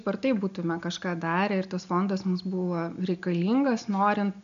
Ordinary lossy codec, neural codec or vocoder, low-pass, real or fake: AAC, 96 kbps; none; 7.2 kHz; real